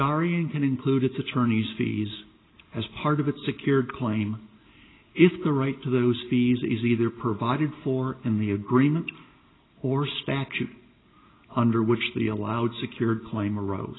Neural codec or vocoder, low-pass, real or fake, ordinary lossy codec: none; 7.2 kHz; real; AAC, 16 kbps